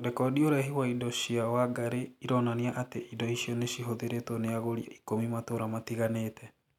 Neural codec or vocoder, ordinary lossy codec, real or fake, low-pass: none; none; real; 19.8 kHz